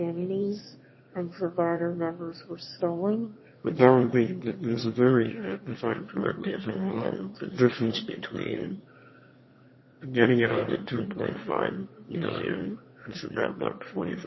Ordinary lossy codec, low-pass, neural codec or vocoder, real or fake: MP3, 24 kbps; 7.2 kHz; autoencoder, 22.05 kHz, a latent of 192 numbers a frame, VITS, trained on one speaker; fake